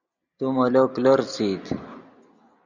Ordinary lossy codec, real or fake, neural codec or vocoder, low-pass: Opus, 64 kbps; real; none; 7.2 kHz